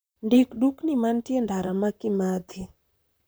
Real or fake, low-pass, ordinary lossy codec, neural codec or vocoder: fake; none; none; vocoder, 44.1 kHz, 128 mel bands, Pupu-Vocoder